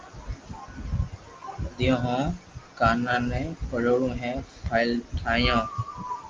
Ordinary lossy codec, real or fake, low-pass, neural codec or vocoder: Opus, 32 kbps; real; 7.2 kHz; none